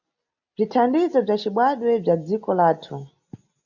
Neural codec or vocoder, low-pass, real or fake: none; 7.2 kHz; real